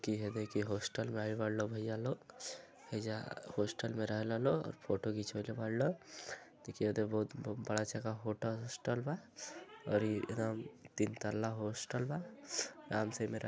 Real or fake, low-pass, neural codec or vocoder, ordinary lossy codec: real; none; none; none